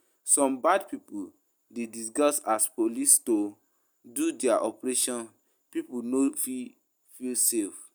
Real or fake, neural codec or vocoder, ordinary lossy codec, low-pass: real; none; none; none